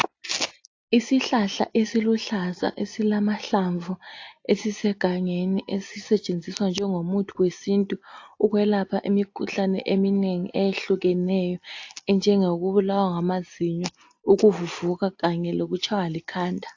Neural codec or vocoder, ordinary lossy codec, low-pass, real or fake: none; AAC, 48 kbps; 7.2 kHz; real